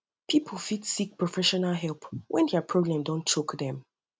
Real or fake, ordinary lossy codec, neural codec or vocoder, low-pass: real; none; none; none